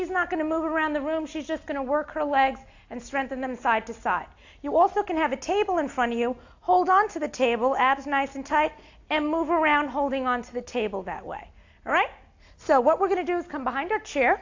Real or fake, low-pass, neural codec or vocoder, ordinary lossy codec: real; 7.2 kHz; none; AAC, 48 kbps